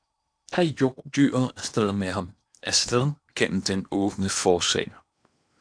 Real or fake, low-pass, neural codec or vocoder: fake; 9.9 kHz; codec, 16 kHz in and 24 kHz out, 0.8 kbps, FocalCodec, streaming, 65536 codes